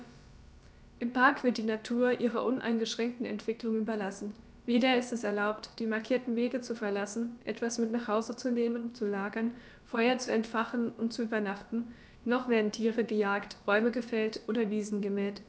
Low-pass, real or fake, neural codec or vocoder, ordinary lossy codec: none; fake; codec, 16 kHz, about 1 kbps, DyCAST, with the encoder's durations; none